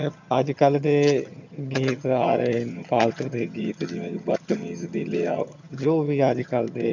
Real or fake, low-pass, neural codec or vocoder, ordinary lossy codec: fake; 7.2 kHz; vocoder, 22.05 kHz, 80 mel bands, HiFi-GAN; none